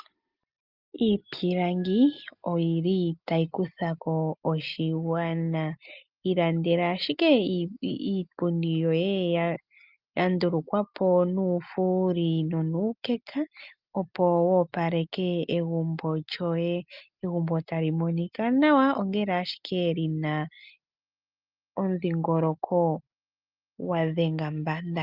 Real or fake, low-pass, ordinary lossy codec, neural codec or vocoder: real; 5.4 kHz; Opus, 24 kbps; none